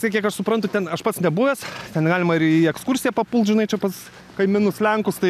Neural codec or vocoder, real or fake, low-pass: none; real; 14.4 kHz